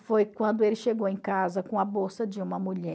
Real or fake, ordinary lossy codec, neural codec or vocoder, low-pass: real; none; none; none